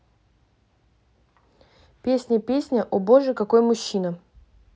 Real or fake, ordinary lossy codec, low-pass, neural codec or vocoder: real; none; none; none